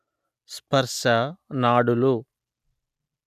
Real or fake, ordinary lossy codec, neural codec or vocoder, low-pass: real; none; none; 14.4 kHz